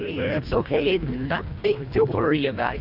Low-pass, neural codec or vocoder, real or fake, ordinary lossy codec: 5.4 kHz; codec, 24 kHz, 1.5 kbps, HILCodec; fake; AAC, 48 kbps